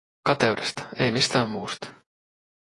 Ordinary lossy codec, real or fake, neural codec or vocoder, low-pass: AAC, 32 kbps; fake; vocoder, 48 kHz, 128 mel bands, Vocos; 10.8 kHz